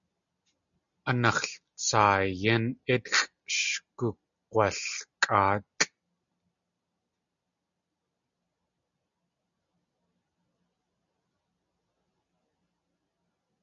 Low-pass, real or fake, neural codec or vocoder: 7.2 kHz; real; none